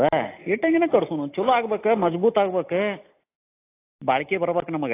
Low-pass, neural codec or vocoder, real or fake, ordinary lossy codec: 3.6 kHz; none; real; AAC, 24 kbps